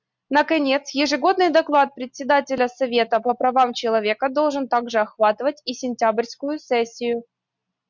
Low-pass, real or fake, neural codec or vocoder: 7.2 kHz; real; none